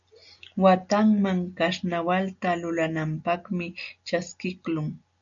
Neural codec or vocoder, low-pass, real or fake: none; 7.2 kHz; real